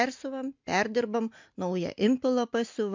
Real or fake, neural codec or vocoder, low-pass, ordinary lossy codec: real; none; 7.2 kHz; MP3, 64 kbps